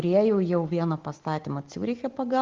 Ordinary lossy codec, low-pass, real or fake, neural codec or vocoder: Opus, 16 kbps; 7.2 kHz; real; none